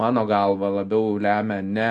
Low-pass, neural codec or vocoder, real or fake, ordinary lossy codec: 9.9 kHz; none; real; AAC, 64 kbps